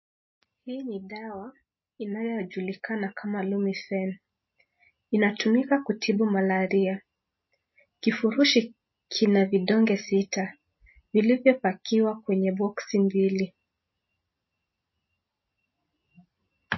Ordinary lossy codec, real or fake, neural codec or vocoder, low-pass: MP3, 24 kbps; real; none; 7.2 kHz